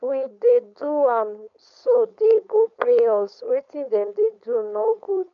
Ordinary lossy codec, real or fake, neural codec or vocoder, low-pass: MP3, 96 kbps; fake; codec, 16 kHz, 4.8 kbps, FACodec; 7.2 kHz